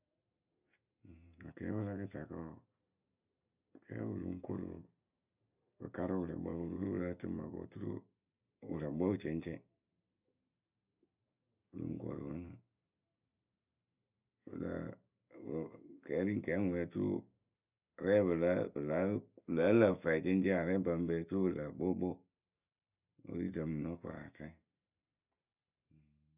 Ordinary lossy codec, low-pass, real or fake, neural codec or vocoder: none; 3.6 kHz; real; none